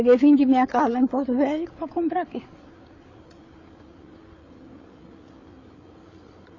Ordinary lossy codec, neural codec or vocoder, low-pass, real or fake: AAC, 32 kbps; codec, 16 kHz, 8 kbps, FreqCodec, larger model; 7.2 kHz; fake